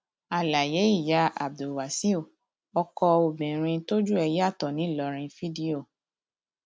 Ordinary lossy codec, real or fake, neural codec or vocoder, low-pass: none; real; none; none